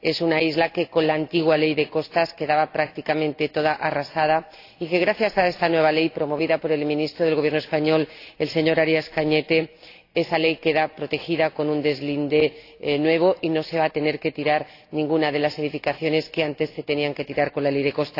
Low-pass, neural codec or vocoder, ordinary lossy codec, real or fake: 5.4 kHz; none; AAC, 32 kbps; real